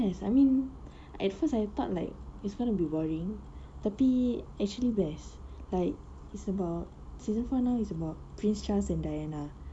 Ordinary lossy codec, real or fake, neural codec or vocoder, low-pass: none; real; none; 9.9 kHz